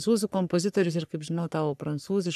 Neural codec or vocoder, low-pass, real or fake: codec, 44.1 kHz, 3.4 kbps, Pupu-Codec; 14.4 kHz; fake